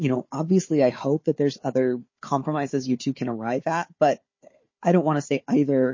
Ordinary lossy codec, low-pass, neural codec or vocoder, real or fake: MP3, 32 kbps; 7.2 kHz; codec, 16 kHz, 4 kbps, FunCodec, trained on Chinese and English, 50 frames a second; fake